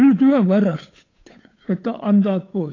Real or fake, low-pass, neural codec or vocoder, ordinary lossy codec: fake; 7.2 kHz; codec, 16 kHz, 8 kbps, FunCodec, trained on Chinese and English, 25 frames a second; AAC, 32 kbps